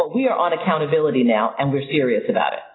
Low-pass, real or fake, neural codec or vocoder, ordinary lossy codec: 7.2 kHz; real; none; AAC, 16 kbps